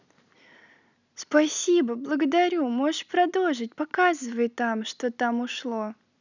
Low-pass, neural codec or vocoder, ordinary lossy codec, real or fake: 7.2 kHz; none; none; real